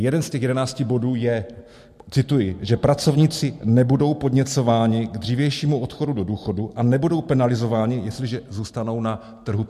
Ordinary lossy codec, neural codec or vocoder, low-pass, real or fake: MP3, 64 kbps; none; 14.4 kHz; real